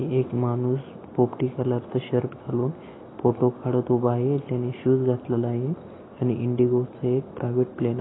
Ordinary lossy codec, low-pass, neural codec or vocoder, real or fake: AAC, 16 kbps; 7.2 kHz; none; real